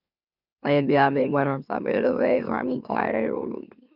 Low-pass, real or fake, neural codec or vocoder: 5.4 kHz; fake; autoencoder, 44.1 kHz, a latent of 192 numbers a frame, MeloTTS